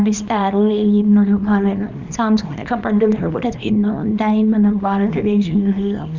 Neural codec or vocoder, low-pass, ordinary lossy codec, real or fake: codec, 24 kHz, 0.9 kbps, WavTokenizer, small release; 7.2 kHz; none; fake